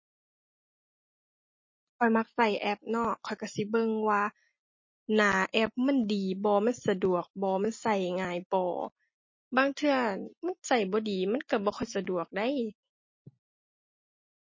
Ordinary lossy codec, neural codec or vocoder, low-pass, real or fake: MP3, 32 kbps; none; 7.2 kHz; real